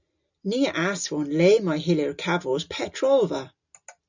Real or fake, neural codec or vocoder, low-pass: real; none; 7.2 kHz